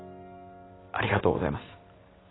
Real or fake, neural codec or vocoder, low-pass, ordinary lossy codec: real; none; 7.2 kHz; AAC, 16 kbps